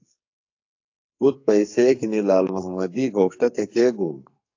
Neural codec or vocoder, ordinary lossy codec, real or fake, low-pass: codec, 44.1 kHz, 2.6 kbps, SNAC; AAC, 48 kbps; fake; 7.2 kHz